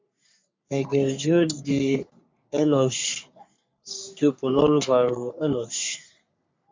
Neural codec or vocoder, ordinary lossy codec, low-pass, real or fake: codec, 44.1 kHz, 3.4 kbps, Pupu-Codec; MP3, 64 kbps; 7.2 kHz; fake